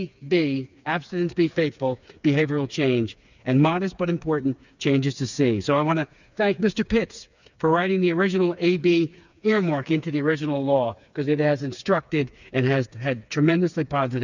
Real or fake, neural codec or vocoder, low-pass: fake; codec, 16 kHz, 4 kbps, FreqCodec, smaller model; 7.2 kHz